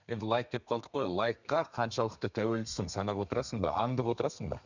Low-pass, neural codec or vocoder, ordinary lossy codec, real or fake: 7.2 kHz; codec, 32 kHz, 1.9 kbps, SNAC; none; fake